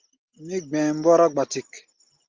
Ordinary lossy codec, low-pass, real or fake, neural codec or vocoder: Opus, 24 kbps; 7.2 kHz; real; none